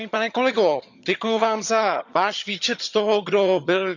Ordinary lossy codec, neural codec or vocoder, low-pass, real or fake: none; vocoder, 22.05 kHz, 80 mel bands, HiFi-GAN; 7.2 kHz; fake